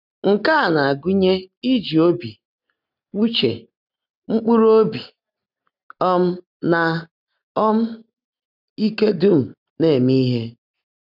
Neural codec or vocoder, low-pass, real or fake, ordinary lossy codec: none; 5.4 kHz; real; none